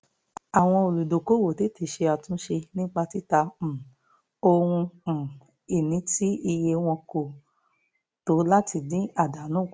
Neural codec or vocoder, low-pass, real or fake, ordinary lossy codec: none; none; real; none